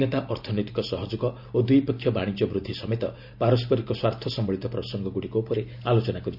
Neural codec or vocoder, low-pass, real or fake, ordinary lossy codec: none; 5.4 kHz; real; none